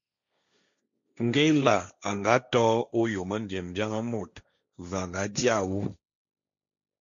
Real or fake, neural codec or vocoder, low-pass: fake; codec, 16 kHz, 1.1 kbps, Voila-Tokenizer; 7.2 kHz